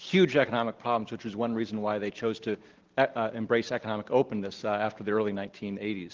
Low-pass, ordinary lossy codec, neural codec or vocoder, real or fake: 7.2 kHz; Opus, 16 kbps; none; real